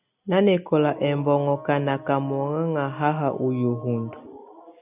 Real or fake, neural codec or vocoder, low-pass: real; none; 3.6 kHz